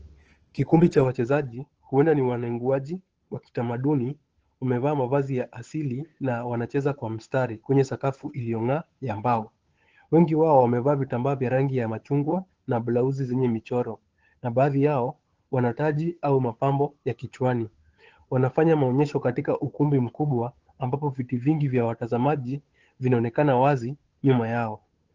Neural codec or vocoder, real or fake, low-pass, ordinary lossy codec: codec, 16 kHz, 8 kbps, FunCodec, trained on Chinese and English, 25 frames a second; fake; 7.2 kHz; Opus, 16 kbps